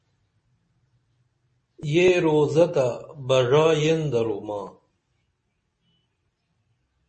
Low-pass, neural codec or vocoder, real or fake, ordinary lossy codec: 10.8 kHz; none; real; MP3, 32 kbps